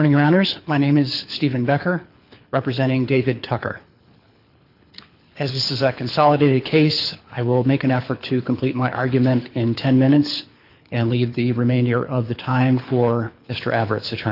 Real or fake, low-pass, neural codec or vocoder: fake; 5.4 kHz; codec, 24 kHz, 6 kbps, HILCodec